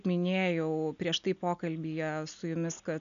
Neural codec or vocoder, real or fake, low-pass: none; real; 7.2 kHz